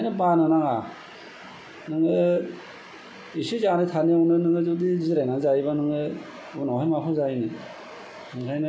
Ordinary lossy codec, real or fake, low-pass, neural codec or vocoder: none; real; none; none